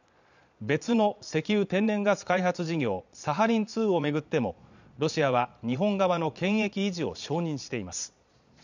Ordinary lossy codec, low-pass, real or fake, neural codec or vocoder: none; 7.2 kHz; fake; vocoder, 22.05 kHz, 80 mel bands, Vocos